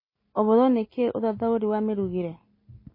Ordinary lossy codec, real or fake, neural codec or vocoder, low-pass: MP3, 24 kbps; real; none; 5.4 kHz